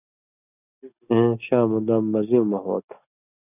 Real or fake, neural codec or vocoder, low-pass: real; none; 3.6 kHz